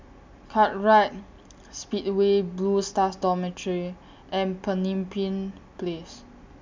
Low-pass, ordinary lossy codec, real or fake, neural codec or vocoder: 7.2 kHz; MP3, 64 kbps; real; none